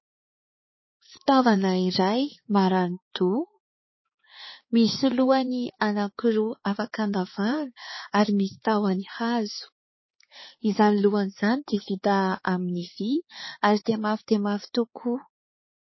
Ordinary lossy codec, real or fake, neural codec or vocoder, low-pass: MP3, 24 kbps; fake; codec, 16 kHz, 4 kbps, X-Codec, HuBERT features, trained on balanced general audio; 7.2 kHz